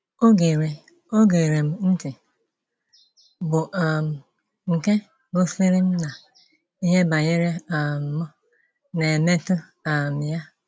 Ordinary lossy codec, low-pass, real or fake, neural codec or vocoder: none; none; real; none